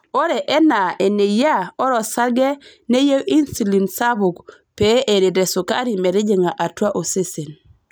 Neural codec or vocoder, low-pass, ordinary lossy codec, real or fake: none; none; none; real